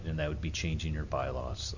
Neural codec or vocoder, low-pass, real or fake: none; 7.2 kHz; real